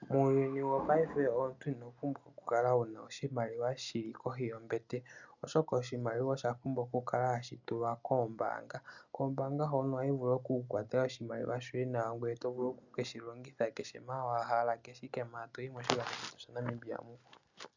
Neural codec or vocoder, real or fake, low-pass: none; real; 7.2 kHz